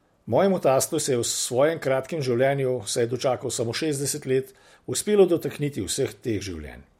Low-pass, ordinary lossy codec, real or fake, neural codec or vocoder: 19.8 kHz; MP3, 64 kbps; real; none